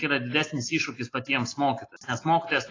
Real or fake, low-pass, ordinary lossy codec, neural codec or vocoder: real; 7.2 kHz; AAC, 32 kbps; none